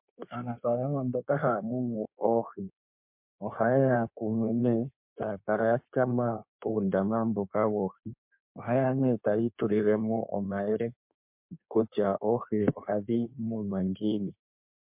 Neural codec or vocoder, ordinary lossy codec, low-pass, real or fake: codec, 16 kHz in and 24 kHz out, 1.1 kbps, FireRedTTS-2 codec; MP3, 32 kbps; 3.6 kHz; fake